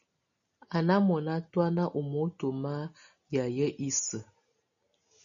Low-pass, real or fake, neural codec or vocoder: 7.2 kHz; real; none